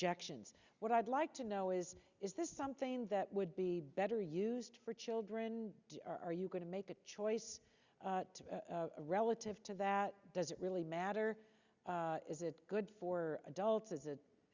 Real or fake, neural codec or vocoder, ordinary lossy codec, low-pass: real; none; Opus, 64 kbps; 7.2 kHz